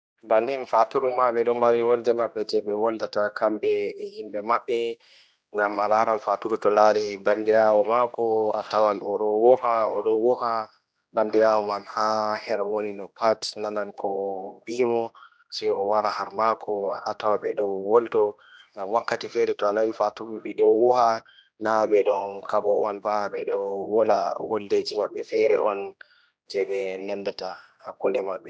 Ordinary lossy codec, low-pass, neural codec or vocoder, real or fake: none; none; codec, 16 kHz, 1 kbps, X-Codec, HuBERT features, trained on general audio; fake